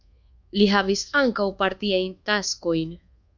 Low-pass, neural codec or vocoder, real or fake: 7.2 kHz; codec, 24 kHz, 1.2 kbps, DualCodec; fake